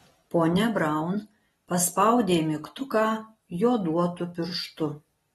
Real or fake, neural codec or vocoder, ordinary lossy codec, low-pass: real; none; AAC, 32 kbps; 19.8 kHz